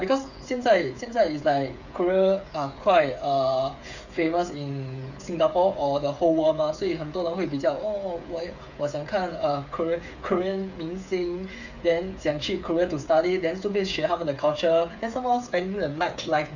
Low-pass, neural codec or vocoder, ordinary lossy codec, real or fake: 7.2 kHz; codec, 16 kHz, 8 kbps, FreqCodec, smaller model; none; fake